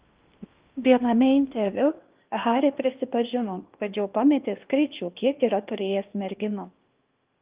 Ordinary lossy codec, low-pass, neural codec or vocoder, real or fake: Opus, 32 kbps; 3.6 kHz; codec, 16 kHz in and 24 kHz out, 0.8 kbps, FocalCodec, streaming, 65536 codes; fake